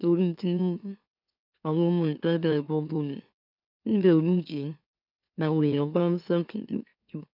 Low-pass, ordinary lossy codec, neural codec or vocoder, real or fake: 5.4 kHz; none; autoencoder, 44.1 kHz, a latent of 192 numbers a frame, MeloTTS; fake